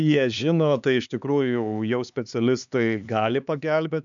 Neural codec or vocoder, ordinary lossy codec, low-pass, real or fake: codec, 16 kHz, 4 kbps, X-Codec, HuBERT features, trained on LibriSpeech; MP3, 96 kbps; 7.2 kHz; fake